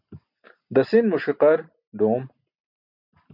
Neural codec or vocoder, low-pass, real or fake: none; 5.4 kHz; real